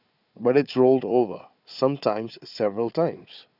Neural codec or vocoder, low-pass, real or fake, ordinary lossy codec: codec, 16 kHz, 4 kbps, FunCodec, trained on Chinese and English, 50 frames a second; 5.4 kHz; fake; none